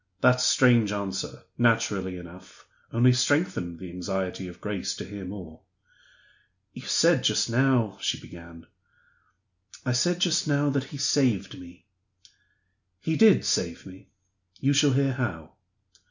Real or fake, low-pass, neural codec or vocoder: real; 7.2 kHz; none